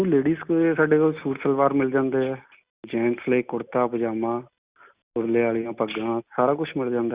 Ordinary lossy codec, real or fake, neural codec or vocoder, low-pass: Opus, 64 kbps; real; none; 3.6 kHz